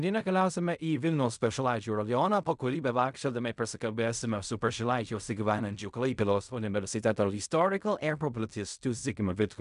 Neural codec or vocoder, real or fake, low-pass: codec, 16 kHz in and 24 kHz out, 0.4 kbps, LongCat-Audio-Codec, fine tuned four codebook decoder; fake; 10.8 kHz